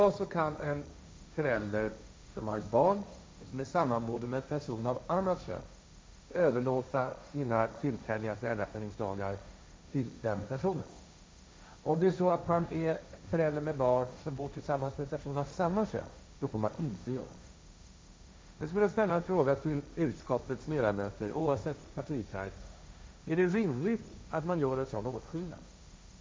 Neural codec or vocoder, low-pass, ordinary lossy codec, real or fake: codec, 16 kHz, 1.1 kbps, Voila-Tokenizer; none; none; fake